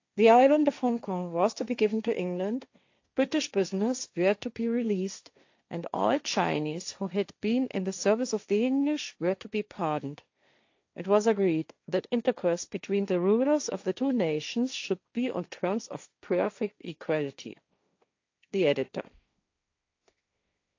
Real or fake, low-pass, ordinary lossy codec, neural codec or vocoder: fake; 7.2 kHz; AAC, 48 kbps; codec, 16 kHz, 1.1 kbps, Voila-Tokenizer